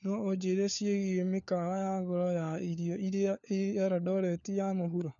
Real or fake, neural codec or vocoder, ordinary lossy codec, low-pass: fake; codec, 16 kHz, 4 kbps, FunCodec, trained on LibriTTS, 50 frames a second; none; 7.2 kHz